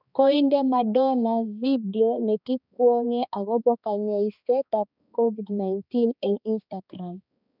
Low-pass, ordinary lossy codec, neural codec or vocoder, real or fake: 5.4 kHz; none; codec, 16 kHz, 2 kbps, X-Codec, HuBERT features, trained on balanced general audio; fake